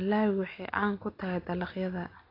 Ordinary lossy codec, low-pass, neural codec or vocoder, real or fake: AAC, 24 kbps; 5.4 kHz; none; real